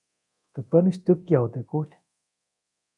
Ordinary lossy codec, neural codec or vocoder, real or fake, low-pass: MP3, 96 kbps; codec, 24 kHz, 0.9 kbps, DualCodec; fake; 10.8 kHz